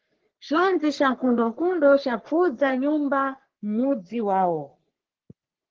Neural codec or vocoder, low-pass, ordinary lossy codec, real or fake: codec, 44.1 kHz, 3.4 kbps, Pupu-Codec; 7.2 kHz; Opus, 16 kbps; fake